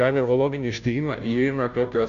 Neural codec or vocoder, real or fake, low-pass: codec, 16 kHz, 0.5 kbps, FunCodec, trained on Chinese and English, 25 frames a second; fake; 7.2 kHz